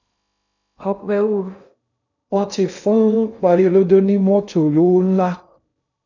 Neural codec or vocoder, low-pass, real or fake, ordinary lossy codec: codec, 16 kHz in and 24 kHz out, 0.6 kbps, FocalCodec, streaming, 2048 codes; 7.2 kHz; fake; none